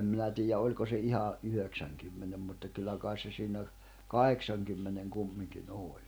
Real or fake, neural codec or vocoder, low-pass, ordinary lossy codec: real; none; none; none